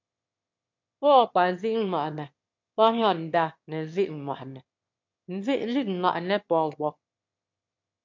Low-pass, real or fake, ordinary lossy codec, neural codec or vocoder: 7.2 kHz; fake; MP3, 48 kbps; autoencoder, 22.05 kHz, a latent of 192 numbers a frame, VITS, trained on one speaker